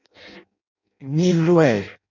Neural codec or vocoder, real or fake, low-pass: codec, 16 kHz in and 24 kHz out, 0.6 kbps, FireRedTTS-2 codec; fake; 7.2 kHz